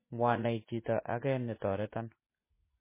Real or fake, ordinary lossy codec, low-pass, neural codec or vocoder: fake; MP3, 16 kbps; 3.6 kHz; vocoder, 44.1 kHz, 80 mel bands, Vocos